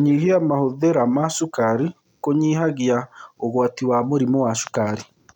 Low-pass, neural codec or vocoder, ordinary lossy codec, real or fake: 19.8 kHz; none; none; real